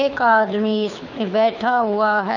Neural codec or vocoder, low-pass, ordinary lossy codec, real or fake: codec, 24 kHz, 6 kbps, HILCodec; 7.2 kHz; none; fake